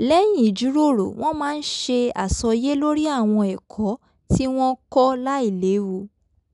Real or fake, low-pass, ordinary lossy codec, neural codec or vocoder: real; 10.8 kHz; none; none